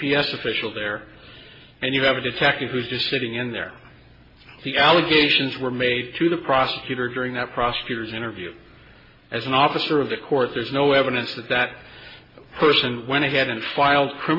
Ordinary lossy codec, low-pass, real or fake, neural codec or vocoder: MP3, 24 kbps; 5.4 kHz; real; none